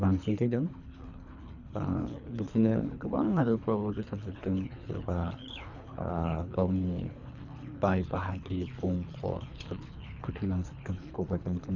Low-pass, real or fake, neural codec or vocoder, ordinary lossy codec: 7.2 kHz; fake; codec, 24 kHz, 3 kbps, HILCodec; none